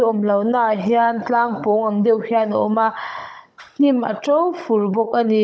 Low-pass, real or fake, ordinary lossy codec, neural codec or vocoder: none; fake; none; codec, 16 kHz, 16 kbps, FunCodec, trained on Chinese and English, 50 frames a second